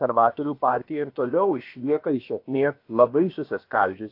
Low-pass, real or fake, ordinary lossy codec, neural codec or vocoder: 5.4 kHz; fake; AAC, 32 kbps; codec, 16 kHz, about 1 kbps, DyCAST, with the encoder's durations